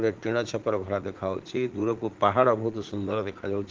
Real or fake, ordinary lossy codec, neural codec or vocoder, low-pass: fake; Opus, 16 kbps; vocoder, 44.1 kHz, 80 mel bands, Vocos; 7.2 kHz